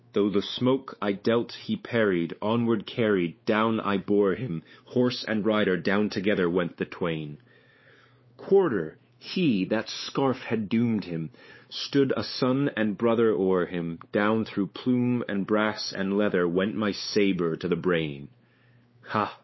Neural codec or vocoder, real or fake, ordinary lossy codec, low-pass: codec, 16 kHz, 4 kbps, X-Codec, WavLM features, trained on Multilingual LibriSpeech; fake; MP3, 24 kbps; 7.2 kHz